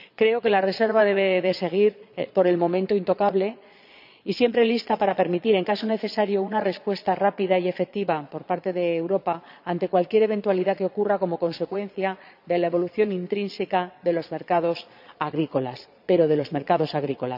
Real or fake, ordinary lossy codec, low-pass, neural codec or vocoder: fake; none; 5.4 kHz; vocoder, 22.05 kHz, 80 mel bands, Vocos